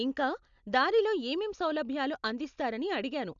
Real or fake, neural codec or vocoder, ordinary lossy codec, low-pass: real; none; none; 7.2 kHz